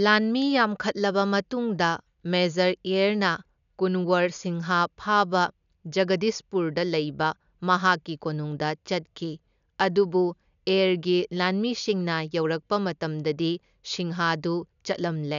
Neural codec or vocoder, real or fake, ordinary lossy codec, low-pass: none; real; none; 7.2 kHz